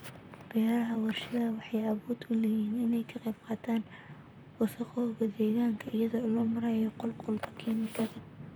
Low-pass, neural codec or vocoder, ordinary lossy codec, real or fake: none; vocoder, 44.1 kHz, 128 mel bands, Pupu-Vocoder; none; fake